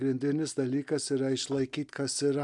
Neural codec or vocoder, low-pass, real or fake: none; 10.8 kHz; real